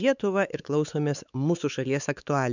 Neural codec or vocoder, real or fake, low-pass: codec, 16 kHz, 4 kbps, X-Codec, WavLM features, trained on Multilingual LibriSpeech; fake; 7.2 kHz